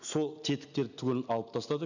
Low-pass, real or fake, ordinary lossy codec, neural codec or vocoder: 7.2 kHz; real; none; none